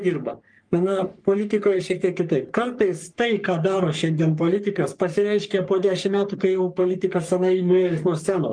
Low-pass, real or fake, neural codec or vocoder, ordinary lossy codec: 9.9 kHz; fake; codec, 44.1 kHz, 3.4 kbps, Pupu-Codec; Opus, 32 kbps